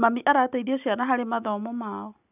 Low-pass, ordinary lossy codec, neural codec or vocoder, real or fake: 3.6 kHz; none; none; real